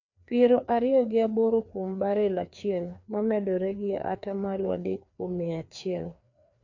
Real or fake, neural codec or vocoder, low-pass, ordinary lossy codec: fake; codec, 16 kHz, 2 kbps, FreqCodec, larger model; 7.2 kHz; none